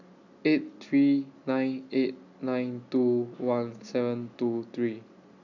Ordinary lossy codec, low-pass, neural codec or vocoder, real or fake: none; 7.2 kHz; none; real